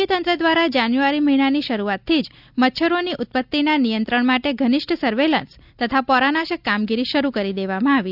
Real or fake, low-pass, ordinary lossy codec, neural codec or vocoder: real; 5.4 kHz; none; none